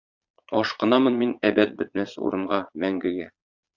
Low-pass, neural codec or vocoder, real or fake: 7.2 kHz; vocoder, 22.05 kHz, 80 mel bands, Vocos; fake